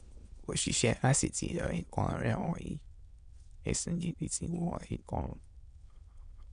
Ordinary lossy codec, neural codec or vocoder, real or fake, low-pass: MP3, 64 kbps; autoencoder, 22.05 kHz, a latent of 192 numbers a frame, VITS, trained on many speakers; fake; 9.9 kHz